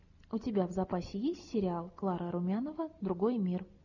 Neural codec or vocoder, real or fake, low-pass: none; real; 7.2 kHz